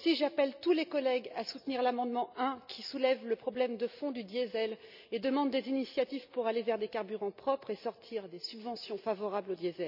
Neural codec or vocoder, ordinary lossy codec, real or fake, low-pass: none; none; real; 5.4 kHz